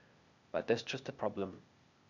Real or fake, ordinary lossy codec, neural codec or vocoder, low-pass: fake; none; codec, 16 kHz, 0.8 kbps, ZipCodec; 7.2 kHz